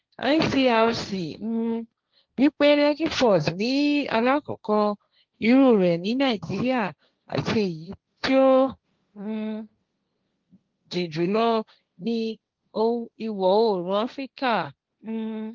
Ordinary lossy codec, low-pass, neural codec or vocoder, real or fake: Opus, 32 kbps; 7.2 kHz; codec, 16 kHz, 1.1 kbps, Voila-Tokenizer; fake